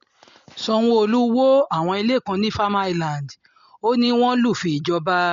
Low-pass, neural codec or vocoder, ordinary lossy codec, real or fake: 7.2 kHz; none; MP3, 48 kbps; real